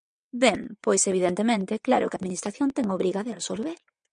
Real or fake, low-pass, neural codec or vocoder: fake; 9.9 kHz; vocoder, 22.05 kHz, 80 mel bands, WaveNeXt